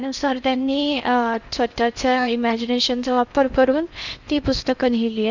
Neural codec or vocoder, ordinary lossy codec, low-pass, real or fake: codec, 16 kHz in and 24 kHz out, 0.8 kbps, FocalCodec, streaming, 65536 codes; none; 7.2 kHz; fake